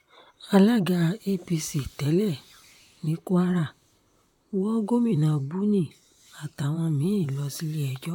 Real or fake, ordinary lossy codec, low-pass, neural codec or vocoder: fake; none; 19.8 kHz; vocoder, 44.1 kHz, 128 mel bands, Pupu-Vocoder